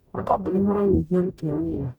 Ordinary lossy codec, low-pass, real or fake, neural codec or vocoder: none; 19.8 kHz; fake; codec, 44.1 kHz, 0.9 kbps, DAC